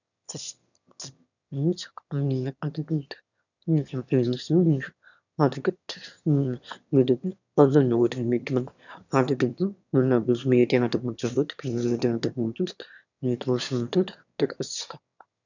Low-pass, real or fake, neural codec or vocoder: 7.2 kHz; fake; autoencoder, 22.05 kHz, a latent of 192 numbers a frame, VITS, trained on one speaker